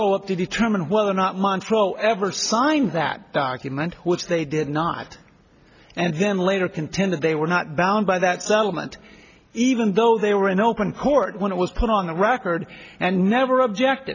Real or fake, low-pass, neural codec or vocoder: real; 7.2 kHz; none